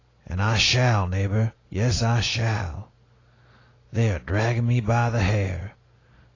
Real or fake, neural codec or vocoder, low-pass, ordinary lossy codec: real; none; 7.2 kHz; AAC, 32 kbps